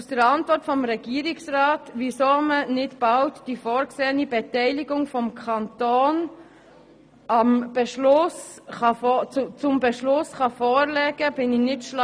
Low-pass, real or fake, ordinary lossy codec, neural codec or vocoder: none; real; none; none